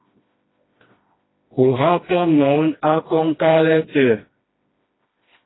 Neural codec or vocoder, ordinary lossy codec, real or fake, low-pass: codec, 16 kHz, 1 kbps, FreqCodec, smaller model; AAC, 16 kbps; fake; 7.2 kHz